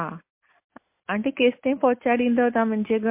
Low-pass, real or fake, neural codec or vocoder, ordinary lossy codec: 3.6 kHz; real; none; MP3, 24 kbps